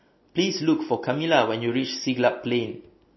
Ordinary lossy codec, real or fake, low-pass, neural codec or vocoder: MP3, 24 kbps; real; 7.2 kHz; none